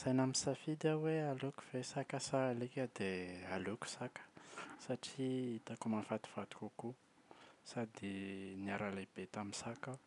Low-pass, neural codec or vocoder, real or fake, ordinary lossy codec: 10.8 kHz; none; real; none